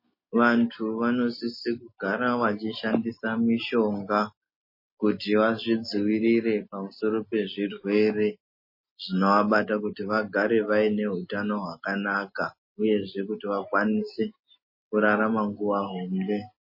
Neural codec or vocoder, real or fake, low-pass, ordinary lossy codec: none; real; 5.4 kHz; MP3, 24 kbps